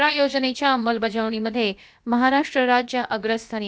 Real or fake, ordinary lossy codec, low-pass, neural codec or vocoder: fake; none; none; codec, 16 kHz, about 1 kbps, DyCAST, with the encoder's durations